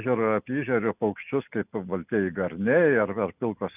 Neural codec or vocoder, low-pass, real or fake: none; 3.6 kHz; real